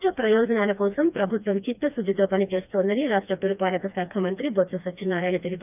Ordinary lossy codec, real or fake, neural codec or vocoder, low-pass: none; fake; codec, 16 kHz, 2 kbps, FreqCodec, smaller model; 3.6 kHz